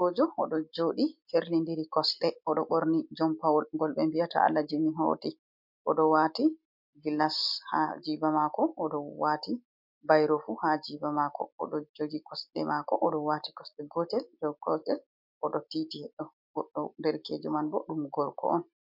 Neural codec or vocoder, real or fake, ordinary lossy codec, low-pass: none; real; MP3, 48 kbps; 5.4 kHz